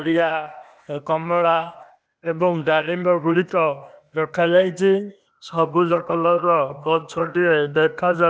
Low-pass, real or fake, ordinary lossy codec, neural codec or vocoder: none; fake; none; codec, 16 kHz, 0.8 kbps, ZipCodec